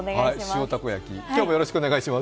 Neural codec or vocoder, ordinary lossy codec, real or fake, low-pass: none; none; real; none